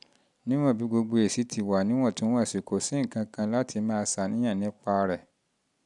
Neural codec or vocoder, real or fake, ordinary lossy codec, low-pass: none; real; none; 10.8 kHz